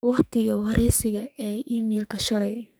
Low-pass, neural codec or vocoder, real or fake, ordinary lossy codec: none; codec, 44.1 kHz, 2.6 kbps, SNAC; fake; none